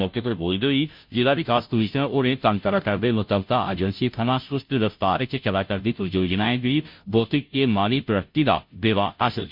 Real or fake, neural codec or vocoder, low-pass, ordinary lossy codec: fake; codec, 16 kHz, 0.5 kbps, FunCodec, trained on Chinese and English, 25 frames a second; 5.4 kHz; none